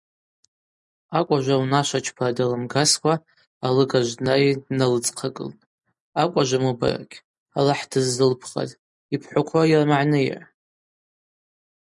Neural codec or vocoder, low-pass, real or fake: none; 10.8 kHz; real